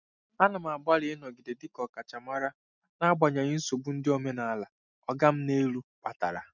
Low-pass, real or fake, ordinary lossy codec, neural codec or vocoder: 7.2 kHz; real; none; none